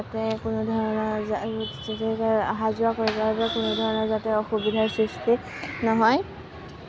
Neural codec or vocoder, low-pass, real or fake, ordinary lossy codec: none; none; real; none